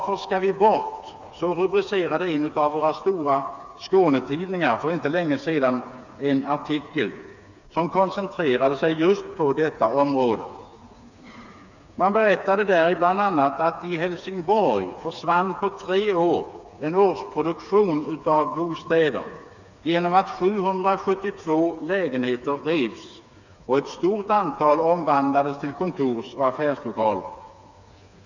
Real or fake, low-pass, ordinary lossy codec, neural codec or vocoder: fake; 7.2 kHz; none; codec, 16 kHz, 4 kbps, FreqCodec, smaller model